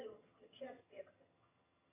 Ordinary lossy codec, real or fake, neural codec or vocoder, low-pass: AAC, 16 kbps; fake; vocoder, 22.05 kHz, 80 mel bands, HiFi-GAN; 3.6 kHz